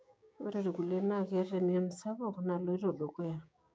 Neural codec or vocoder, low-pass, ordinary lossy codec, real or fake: codec, 16 kHz, 6 kbps, DAC; none; none; fake